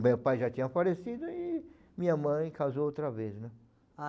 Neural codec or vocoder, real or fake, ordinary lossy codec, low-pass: none; real; none; none